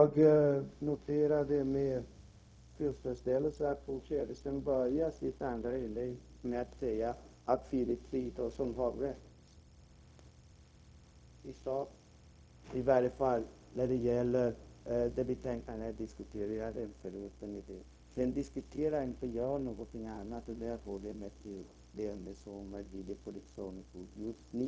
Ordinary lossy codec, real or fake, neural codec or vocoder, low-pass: none; fake; codec, 16 kHz, 0.4 kbps, LongCat-Audio-Codec; none